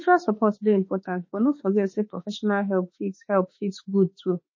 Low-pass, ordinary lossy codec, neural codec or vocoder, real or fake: 7.2 kHz; MP3, 32 kbps; autoencoder, 48 kHz, 32 numbers a frame, DAC-VAE, trained on Japanese speech; fake